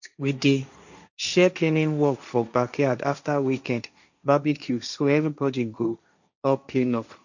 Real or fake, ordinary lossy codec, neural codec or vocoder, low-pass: fake; none; codec, 16 kHz, 1.1 kbps, Voila-Tokenizer; 7.2 kHz